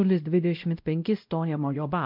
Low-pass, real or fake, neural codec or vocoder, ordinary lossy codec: 5.4 kHz; fake; codec, 16 kHz, 0.5 kbps, X-Codec, WavLM features, trained on Multilingual LibriSpeech; MP3, 48 kbps